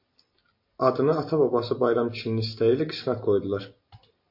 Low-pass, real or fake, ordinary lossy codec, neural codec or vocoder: 5.4 kHz; real; MP3, 32 kbps; none